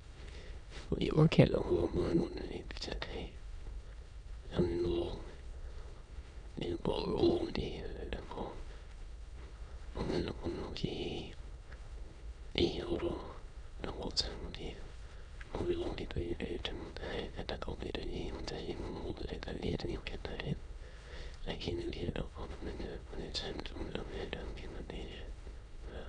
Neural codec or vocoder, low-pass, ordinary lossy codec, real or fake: autoencoder, 22.05 kHz, a latent of 192 numbers a frame, VITS, trained on many speakers; 9.9 kHz; none; fake